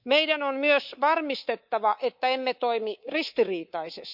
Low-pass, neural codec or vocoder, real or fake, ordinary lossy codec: 5.4 kHz; codec, 24 kHz, 3.1 kbps, DualCodec; fake; none